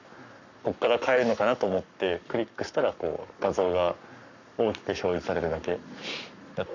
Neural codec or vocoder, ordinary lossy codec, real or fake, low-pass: codec, 44.1 kHz, 7.8 kbps, Pupu-Codec; none; fake; 7.2 kHz